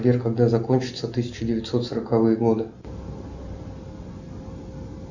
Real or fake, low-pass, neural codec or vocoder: real; 7.2 kHz; none